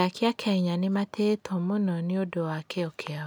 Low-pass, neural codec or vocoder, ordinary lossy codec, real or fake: none; none; none; real